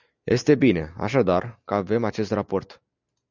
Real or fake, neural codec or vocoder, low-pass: real; none; 7.2 kHz